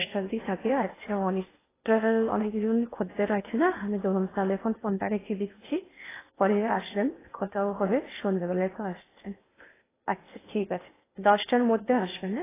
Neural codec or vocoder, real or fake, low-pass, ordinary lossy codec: codec, 16 kHz in and 24 kHz out, 0.8 kbps, FocalCodec, streaming, 65536 codes; fake; 3.6 kHz; AAC, 16 kbps